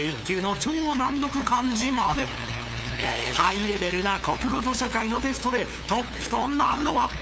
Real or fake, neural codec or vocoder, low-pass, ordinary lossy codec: fake; codec, 16 kHz, 2 kbps, FunCodec, trained on LibriTTS, 25 frames a second; none; none